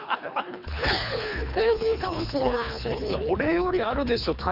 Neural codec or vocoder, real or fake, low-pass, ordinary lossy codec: codec, 24 kHz, 3 kbps, HILCodec; fake; 5.4 kHz; none